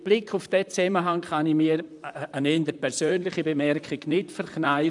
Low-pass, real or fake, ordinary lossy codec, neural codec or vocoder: 10.8 kHz; fake; none; vocoder, 44.1 kHz, 128 mel bands, Pupu-Vocoder